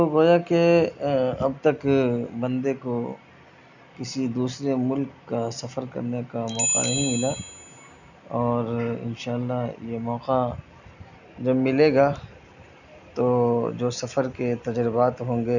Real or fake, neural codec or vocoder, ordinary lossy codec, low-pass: real; none; none; 7.2 kHz